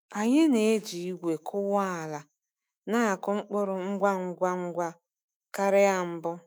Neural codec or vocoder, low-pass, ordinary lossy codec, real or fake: autoencoder, 48 kHz, 128 numbers a frame, DAC-VAE, trained on Japanese speech; none; none; fake